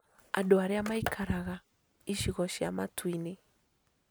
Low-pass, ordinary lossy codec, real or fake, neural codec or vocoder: none; none; real; none